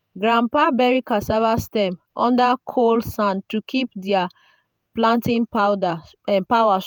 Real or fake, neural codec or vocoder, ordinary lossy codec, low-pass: fake; vocoder, 48 kHz, 128 mel bands, Vocos; none; none